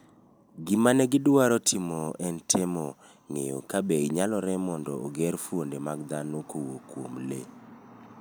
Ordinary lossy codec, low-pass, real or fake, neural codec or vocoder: none; none; real; none